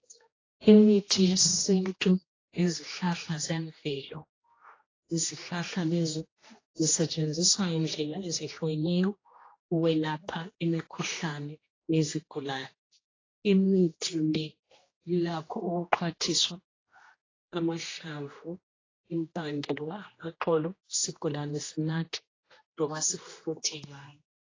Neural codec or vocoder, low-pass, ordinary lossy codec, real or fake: codec, 16 kHz, 1 kbps, X-Codec, HuBERT features, trained on general audio; 7.2 kHz; AAC, 32 kbps; fake